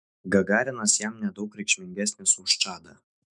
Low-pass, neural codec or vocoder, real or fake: 10.8 kHz; autoencoder, 48 kHz, 128 numbers a frame, DAC-VAE, trained on Japanese speech; fake